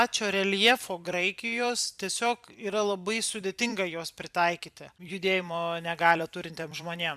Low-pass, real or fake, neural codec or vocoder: 14.4 kHz; fake; vocoder, 44.1 kHz, 128 mel bands every 256 samples, BigVGAN v2